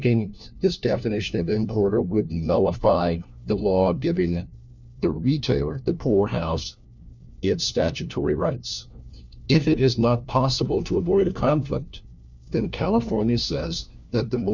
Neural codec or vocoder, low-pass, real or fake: codec, 16 kHz, 1 kbps, FunCodec, trained on LibriTTS, 50 frames a second; 7.2 kHz; fake